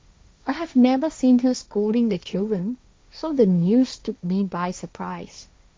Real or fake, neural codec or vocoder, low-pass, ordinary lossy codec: fake; codec, 16 kHz, 1.1 kbps, Voila-Tokenizer; none; none